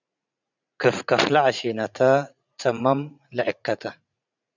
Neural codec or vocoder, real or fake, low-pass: vocoder, 44.1 kHz, 80 mel bands, Vocos; fake; 7.2 kHz